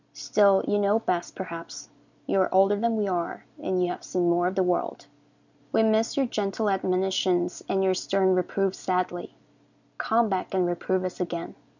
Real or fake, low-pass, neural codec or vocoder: real; 7.2 kHz; none